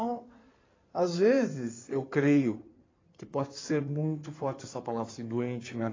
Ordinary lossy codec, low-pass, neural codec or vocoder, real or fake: AAC, 32 kbps; 7.2 kHz; codec, 16 kHz in and 24 kHz out, 2.2 kbps, FireRedTTS-2 codec; fake